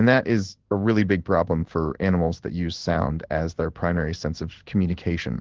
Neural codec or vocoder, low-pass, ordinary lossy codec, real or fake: codec, 16 kHz in and 24 kHz out, 1 kbps, XY-Tokenizer; 7.2 kHz; Opus, 16 kbps; fake